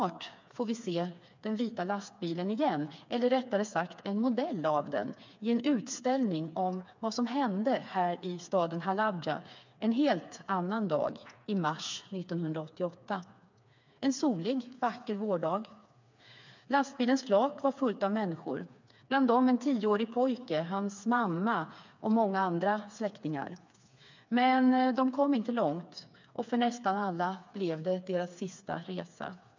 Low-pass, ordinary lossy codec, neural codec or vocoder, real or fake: 7.2 kHz; MP3, 64 kbps; codec, 16 kHz, 4 kbps, FreqCodec, smaller model; fake